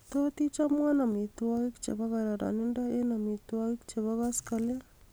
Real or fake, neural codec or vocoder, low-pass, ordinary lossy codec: real; none; none; none